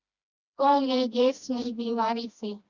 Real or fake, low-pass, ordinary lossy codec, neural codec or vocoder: fake; 7.2 kHz; Opus, 64 kbps; codec, 16 kHz, 1 kbps, FreqCodec, smaller model